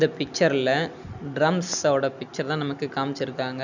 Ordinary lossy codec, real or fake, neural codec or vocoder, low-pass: none; real; none; 7.2 kHz